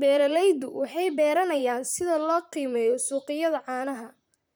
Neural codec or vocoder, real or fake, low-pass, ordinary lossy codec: vocoder, 44.1 kHz, 128 mel bands, Pupu-Vocoder; fake; none; none